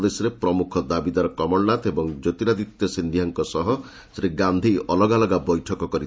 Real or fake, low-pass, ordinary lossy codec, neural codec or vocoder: real; none; none; none